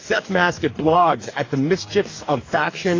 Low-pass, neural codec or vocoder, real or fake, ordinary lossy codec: 7.2 kHz; codec, 44.1 kHz, 2.6 kbps, DAC; fake; AAC, 32 kbps